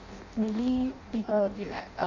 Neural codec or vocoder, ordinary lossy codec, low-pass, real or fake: codec, 16 kHz in and 24 kHz out, 0.6 kbps, FireRedTTS-2 codec; none; 7.2 kHz; fake